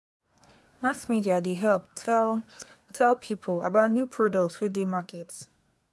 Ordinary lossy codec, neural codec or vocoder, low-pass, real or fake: none; codec, 24 kHz, 1 kbps, SNAC; none; fake